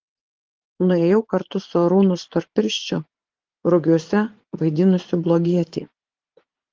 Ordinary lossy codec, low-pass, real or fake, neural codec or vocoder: Opus, 16 kbps; 7.2 kHz; fake; vocoder, 44.1 kHz, 80 mel bands, Vocos